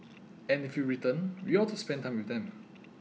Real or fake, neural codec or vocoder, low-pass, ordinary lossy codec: real; none; none; none